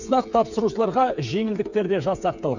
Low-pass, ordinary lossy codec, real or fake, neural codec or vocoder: 7.2 kHz; none; fake; codec, 16 kHz, 8 kbps, FreqCodec, smaller model